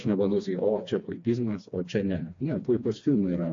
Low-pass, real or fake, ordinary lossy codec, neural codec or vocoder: 7.2 kHz; fake; AAC, 48 kbps; codec, 16 kHz, 2 kbps, FreqCodec, smaller model